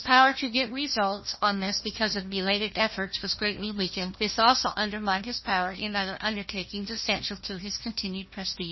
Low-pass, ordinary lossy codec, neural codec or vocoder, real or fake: 7.2 kHz; MP3, 24 kbps; codec, 16 kHz, 1 kbps, FunCodec, trained on Chinese and English, 50 frames a second; fake